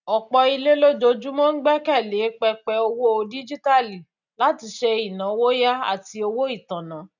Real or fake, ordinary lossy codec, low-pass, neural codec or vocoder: real; none; 7.2 kHz; none